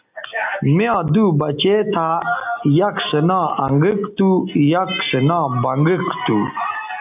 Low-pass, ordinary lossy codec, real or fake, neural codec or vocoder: 3.6 kHz; AAC, 32 kbps; real; none